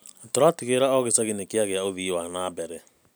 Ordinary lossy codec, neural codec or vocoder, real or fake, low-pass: none; none; real; none